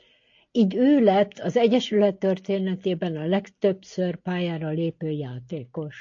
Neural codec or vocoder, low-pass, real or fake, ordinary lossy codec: none; 7.2 kHz; real; AAC, 48 kbps